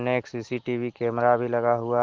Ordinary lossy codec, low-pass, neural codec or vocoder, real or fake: Opus, 16 kbps; 7.2 kHz; none; real